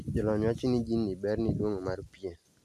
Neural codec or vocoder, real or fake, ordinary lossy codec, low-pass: none; real; none; 14.4 kHz